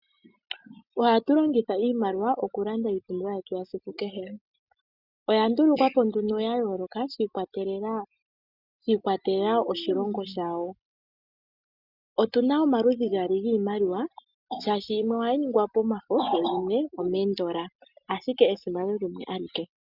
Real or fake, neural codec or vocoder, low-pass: real; none; 5.4 kHz